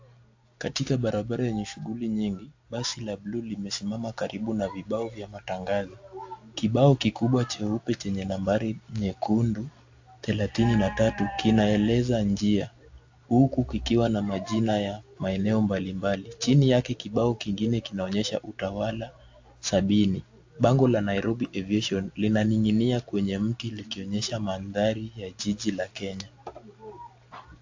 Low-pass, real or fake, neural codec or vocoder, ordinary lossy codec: 7.2 kHz; real; none; AAC, 48 kbps